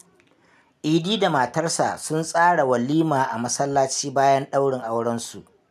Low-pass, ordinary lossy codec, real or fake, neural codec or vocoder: 14.4 kHz; none; real; none